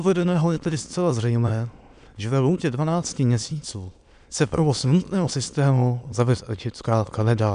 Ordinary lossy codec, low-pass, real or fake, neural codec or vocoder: MP3, 96 kbps; 9.9 kHz; fake; autoencoder, 22.05 kHz, a latent of 192 numbers a frame, VITS, trained on many speakers